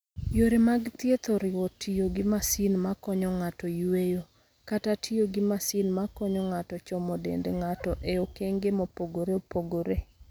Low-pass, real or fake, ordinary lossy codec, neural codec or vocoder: none; real; none; none